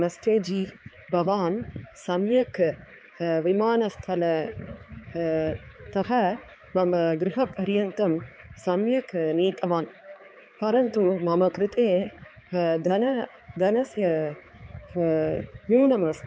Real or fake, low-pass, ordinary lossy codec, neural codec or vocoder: fake; none; none; codec, 16 kHz, 4 kbps, X-Codec, HuBERT features, trained on balanced general audio